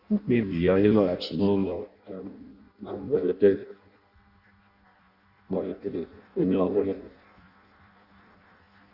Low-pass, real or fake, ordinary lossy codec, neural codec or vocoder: 5.4 kHz; fake; Opus, 64 kbps; codec, 16 kHz in and 24 kHz out, 0.6 kbps, FireRedTTS-2 codec